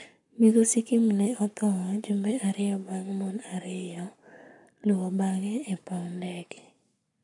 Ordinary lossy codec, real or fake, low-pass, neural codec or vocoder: none; fake; 10.8 kHz; codec, 44.1 kHz, 7.8 kbps, Pupu-Codec